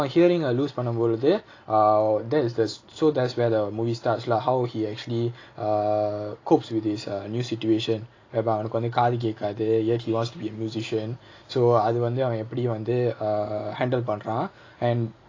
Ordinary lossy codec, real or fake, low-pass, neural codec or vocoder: AAC, 32 kbps; real; 7.2 kHz; none